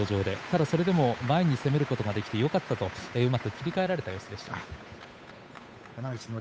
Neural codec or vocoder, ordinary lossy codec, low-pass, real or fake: codec, 16 kHz, 8 kbps, FunCodec, trained on Chinese and English, 25 frames a second; none; none; fake